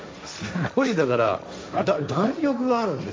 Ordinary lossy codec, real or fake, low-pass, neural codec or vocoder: none; fake; none; codec, 16 kHz, 1.1 kbps, Voila-Tokenizer